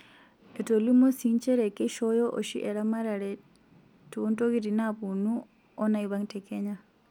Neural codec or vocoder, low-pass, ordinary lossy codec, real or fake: none; 19.8 kHz; none; real